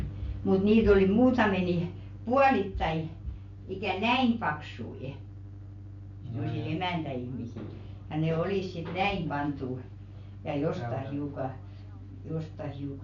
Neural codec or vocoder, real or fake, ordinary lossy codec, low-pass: none; real; none; 7.2 kHz